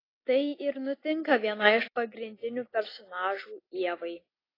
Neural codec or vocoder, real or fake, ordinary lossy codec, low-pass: none; real; AAC, 24 kbps; 5.4 kHz